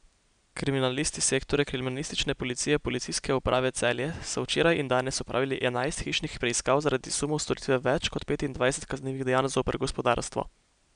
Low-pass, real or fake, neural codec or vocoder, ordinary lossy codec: 9.9 kHz; real; none; none